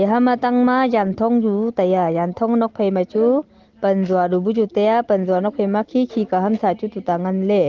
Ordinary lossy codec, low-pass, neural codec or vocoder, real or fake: Opus, 16 kbps; 7.2 kHz; none; real